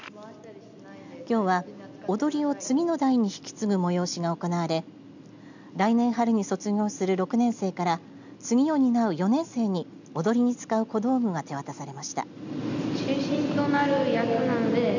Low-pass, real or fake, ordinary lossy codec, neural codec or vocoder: 7.2 kHz; real; none; none